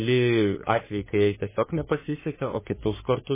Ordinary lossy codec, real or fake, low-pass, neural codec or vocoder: MP3, 16 kbps; fake; 3.6 kHz; codec, 44.1 kHz, 3.4 kbps, Pupu-Codec